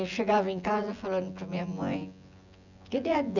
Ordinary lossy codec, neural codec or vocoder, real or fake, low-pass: none; vocoder, 24 kHz, 100 mel bands, Vocos; fake; 7.2 kHz